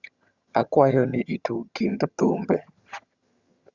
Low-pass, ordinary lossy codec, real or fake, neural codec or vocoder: 7.2 kHz; Opus, 64 kbps; fake; vocoder, 22.05 kHz, 80 mel bands, HiFi-GAN